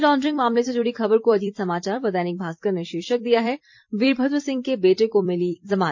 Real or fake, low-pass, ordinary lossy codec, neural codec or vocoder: fake; 7.2 kHz; MP3, 48 kbps; vocoder, 44.1 kHz, 128 mel bands every 256 samples, BigVGAN v2